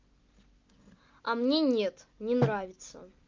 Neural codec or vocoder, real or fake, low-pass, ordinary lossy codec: none; real; 7.2 kHz; Opus, 24 kbps